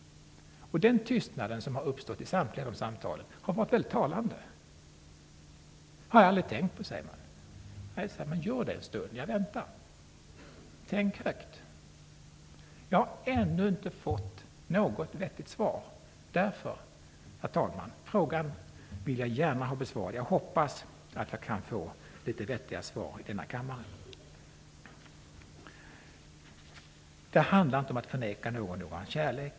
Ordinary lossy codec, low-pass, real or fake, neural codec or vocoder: none; none; real; none